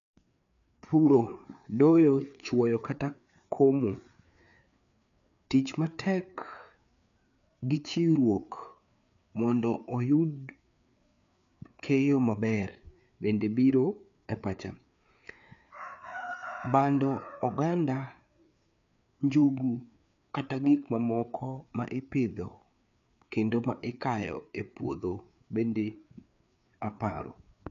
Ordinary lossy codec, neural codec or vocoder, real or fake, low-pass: none; codec, 16 kHz, 4 kbps, FreqCodec, larger model; fake; 7.2 kHz